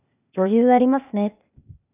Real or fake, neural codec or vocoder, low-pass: fake; codec, 16 kHz, 0.8 kbps, ZipCodec; 3.6 kHz